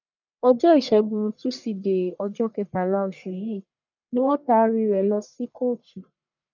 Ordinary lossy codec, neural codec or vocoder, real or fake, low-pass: none; codec, 44.1 kHz, 1.7 kbps, Pupu-Codec; fake; 7.2 kHz